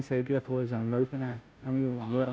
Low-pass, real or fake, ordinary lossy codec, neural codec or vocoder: none; fake; none; codec, 16 kHz, 0.5 kbps, FunCodec, trained on Chinese and English, 25 frames a second